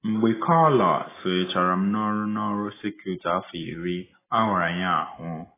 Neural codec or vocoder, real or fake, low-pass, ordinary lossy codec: none; real; 3.6 kHz; AAC, 16 kbps